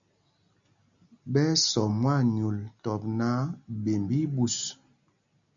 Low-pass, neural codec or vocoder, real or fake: 7.2 kHz; none; real